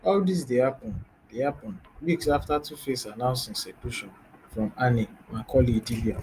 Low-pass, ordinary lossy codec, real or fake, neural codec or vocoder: 14.4 kHz; Opus, 32 kbps; real; none